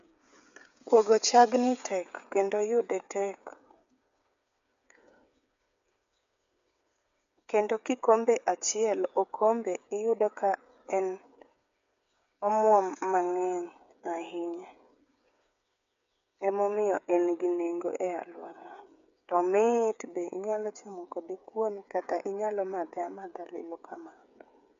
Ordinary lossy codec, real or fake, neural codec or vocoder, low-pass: MP3, 64 kbps; fake; codec, 16 kHz, 8 kbps, FreqCodec, smaller model; 7.2 kHz